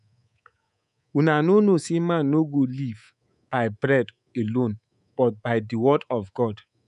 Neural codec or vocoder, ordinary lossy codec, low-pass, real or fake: codec, 24 kHz, 3.1 kbps, DualCodec; none; 10.8 kHz; fake